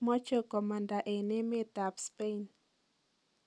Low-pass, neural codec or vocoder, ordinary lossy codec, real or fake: none; none; none; real